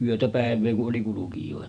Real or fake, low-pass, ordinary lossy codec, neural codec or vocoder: fake; 9.9 kHz; none; vocoder, 48 kHz, 128 mel bands, Vocos